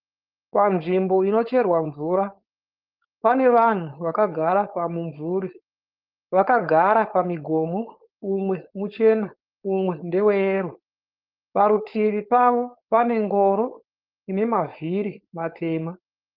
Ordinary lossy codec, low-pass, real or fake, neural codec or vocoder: Opus, 32 kbps; 5.4 kHz; fake; codec, 16 kHz, 4.8 kbps, FACodec